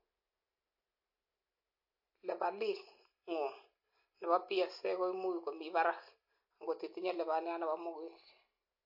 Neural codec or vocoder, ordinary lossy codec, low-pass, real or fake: none; MP3, 32 kbps; 5.4 kHz; real